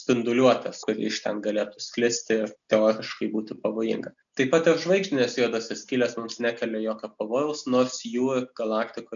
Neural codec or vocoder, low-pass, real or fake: none; 7.2 kHz; real